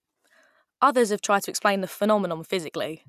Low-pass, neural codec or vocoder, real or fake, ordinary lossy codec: 14.4 kHz; none; real; none